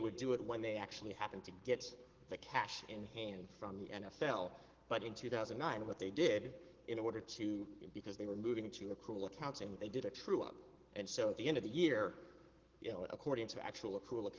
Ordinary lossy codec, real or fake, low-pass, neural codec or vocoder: Opus, 16 kbps; fake; 7.2 kHz; codec, 44.1 kHz, 7.8 kbps, Pupu-Codec